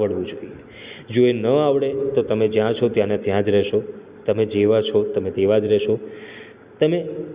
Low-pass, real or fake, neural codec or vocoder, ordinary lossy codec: 3.6 kHz; real; none; Opus, 64 kbps